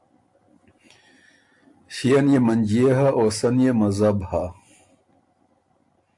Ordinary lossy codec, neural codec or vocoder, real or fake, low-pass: AAC, 64 kbps; none; real; 10.8 kHz